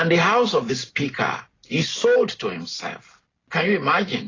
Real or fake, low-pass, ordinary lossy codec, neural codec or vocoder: real; 7.2 kHz; AAC, 32 kbps; none